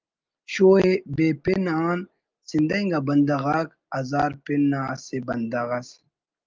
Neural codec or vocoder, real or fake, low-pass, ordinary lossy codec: none; real; 7.2 kHz; Opus, 32 kbps